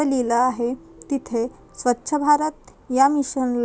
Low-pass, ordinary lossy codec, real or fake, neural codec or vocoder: none; none; real; none